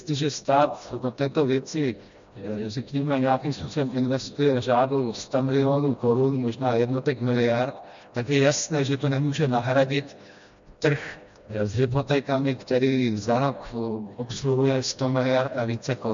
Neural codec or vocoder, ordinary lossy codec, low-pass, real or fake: codec, 16 kHz, 1 kbps, FreqCodec, smaller model; MP3, 64 kbps; 7.2 kHz; fake